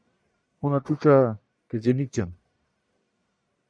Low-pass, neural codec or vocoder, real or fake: 9.9 kHz; codec, 44.1 kHz, 1.7 kbps, Pupu-Codec; fake